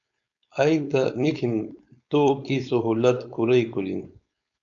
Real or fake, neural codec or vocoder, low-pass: fake; codec, 16 kHz, 4.8 kbps, FACodec; 7.2 kHz